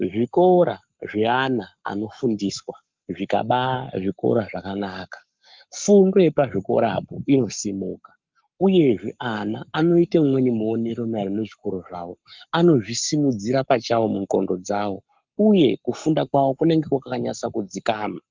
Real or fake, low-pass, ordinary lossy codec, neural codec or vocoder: fake; 7.2 kHz; Opus, 24 kbps; codec, 44.1 kHz, 7.8 kbps, Pupu-Codec